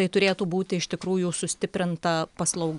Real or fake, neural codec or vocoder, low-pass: real; none; 10.8 kHz